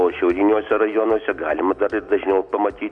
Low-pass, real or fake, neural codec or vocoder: 9.9 kHz; real; none